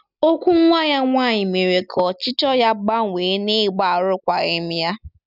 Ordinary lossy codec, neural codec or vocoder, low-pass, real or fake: none; none; 5.4 kHz; real